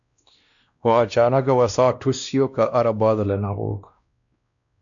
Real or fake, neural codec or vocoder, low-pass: fake; codec, 16 kHz, 1 kbps, X-Codec, WavLM features, trained on Multilingual LibriSpeech; 7.2 kHz